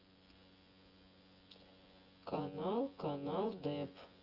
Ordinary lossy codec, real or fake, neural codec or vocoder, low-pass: Opus, 24 kbps; fake; vocoder, 24 kHz, 100 mel bands, Vocos; 5.4 kHz